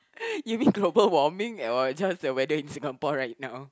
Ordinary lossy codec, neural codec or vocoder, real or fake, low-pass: none; none; real; none